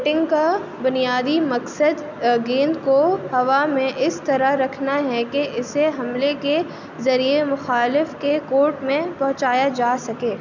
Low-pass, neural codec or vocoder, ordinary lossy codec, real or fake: 7.2 kHz; none; none; real